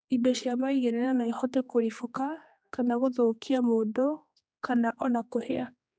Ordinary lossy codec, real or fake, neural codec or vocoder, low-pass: none; fake; codec, 16 kHz, 2 kbps, X-Codec, HuBERT features, trained on general audio; none